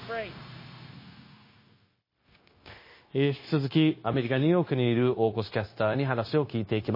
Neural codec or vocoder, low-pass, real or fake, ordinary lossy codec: codec, 16 kHz, 0.9 kbps, LongCat-Audio-Codec; 5.4 kHz; fake; MP3, 24 kbps